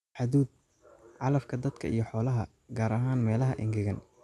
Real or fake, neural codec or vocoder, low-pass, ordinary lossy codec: real; none; none; none